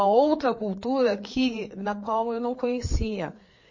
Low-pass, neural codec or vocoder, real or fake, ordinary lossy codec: 7.2 kHz; codec, 16 kHz, 4 kbps, FreqCodec, larger model; fake; MP3, 32 kbps